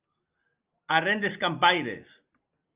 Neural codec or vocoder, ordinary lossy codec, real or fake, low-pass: none; Opus, 24 kbps; real; 3.6 kHz